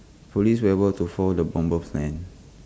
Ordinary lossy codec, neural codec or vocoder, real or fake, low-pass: none; none; real; none